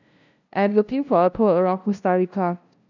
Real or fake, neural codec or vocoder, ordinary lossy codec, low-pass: fake; codec, 16 kHz, 0.5 kbps, FunCodec, trained on LibriTTS, 25 frames a second; none; 7.2 kHz